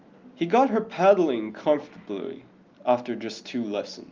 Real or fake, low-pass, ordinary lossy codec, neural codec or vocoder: real; 7.2 kHz; Opus, 32 kbps; none